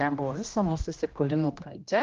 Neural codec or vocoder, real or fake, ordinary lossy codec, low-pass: codec, 16 kHz, 1 kbps, X-Codec, HuBERT features, trained on general audio; fake; Opus, 32 kbps; 7.2 kHz